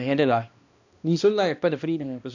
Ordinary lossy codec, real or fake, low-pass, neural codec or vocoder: none; fake; 7.2 kHz; codec, 16 kHz, 1 kbps, X-Codec, HuBERT features, trained on balanced general audio